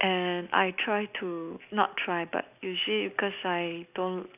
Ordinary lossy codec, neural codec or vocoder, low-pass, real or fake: none; none; 3.6 kHz; real